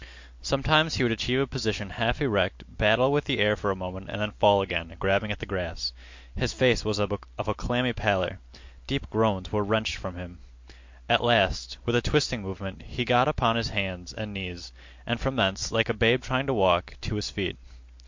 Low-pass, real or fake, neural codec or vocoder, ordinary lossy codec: 7.2 kHz; real; none; MP3, 48 kbps